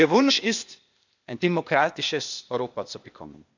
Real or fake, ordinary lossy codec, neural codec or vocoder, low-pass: fake; none; codec, 16 kHz, 0.8 kbps, ZipCodec; 7.2 kHz